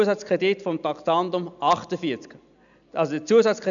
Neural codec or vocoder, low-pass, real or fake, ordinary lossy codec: none; 7.2 kHz; real; none